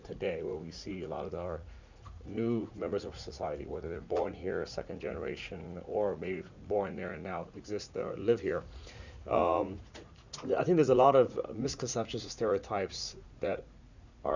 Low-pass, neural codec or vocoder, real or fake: 7.2 kHz; vocoder, 44.1 kHz, 80 mel bands, Vocos; fake